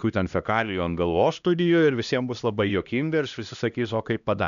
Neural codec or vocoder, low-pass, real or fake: codec, 16 kHz, 1 kbps, X-Codec, HuBERT features, trained on LibriSpeech; 7.2 kHz; fake